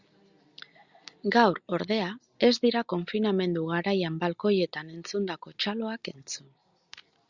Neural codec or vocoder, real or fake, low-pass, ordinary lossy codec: none; real; 7.2 kHz; Opus, 64 kbps